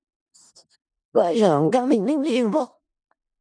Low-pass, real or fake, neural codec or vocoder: 9.9 kHz; fake; codec, 16 kHz in and 24 kHz out, 0.4 kbps, LongCat-Audio-Codec, four codebook decoder